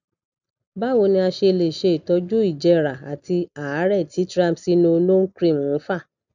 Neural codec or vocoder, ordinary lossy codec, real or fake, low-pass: none; none; real; 7.2 kHz